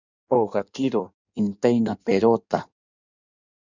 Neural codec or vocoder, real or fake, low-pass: codec, 16 kHz in and 24 kHz out, 1.1 kbps, FireRedTTS-2 codec; fake; 7.2 kHz